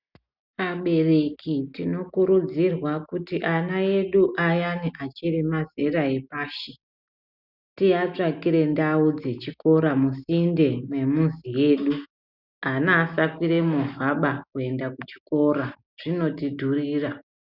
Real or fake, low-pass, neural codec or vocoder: real; 5.4 kHz; none